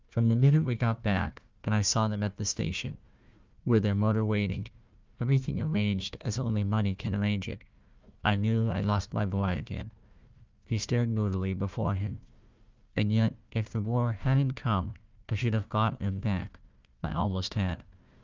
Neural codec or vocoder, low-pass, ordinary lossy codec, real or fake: codec, 16 kHz, 1 kbps, FunCodec, trained on Chinese and English, 50 frames a second; 7.2 kHz; Opus, 24 kbps; fake